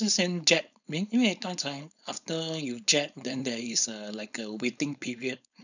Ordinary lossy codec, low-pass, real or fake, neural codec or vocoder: none; 7.2 kHz; fake; codec, 16 kHz, 4.8 kbps, FACodec